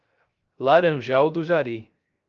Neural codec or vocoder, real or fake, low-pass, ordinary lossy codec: codec, 16 kHz, 0.3 kbps, FocalCodec; fake; 7.2 kHz; Opus, 24 kbps